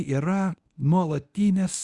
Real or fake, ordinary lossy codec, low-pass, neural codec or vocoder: fake; Opus, 64 kbps; 10.8 kHz; codec, 24 kHz, 0.9 kbps, WavTokenizer, medium speech release version 1